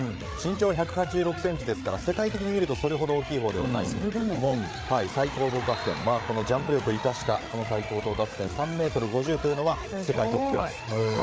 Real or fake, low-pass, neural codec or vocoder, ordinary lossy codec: fake; none; codec, 16 kHz, 8 kbps, FreqCodec, larger model; none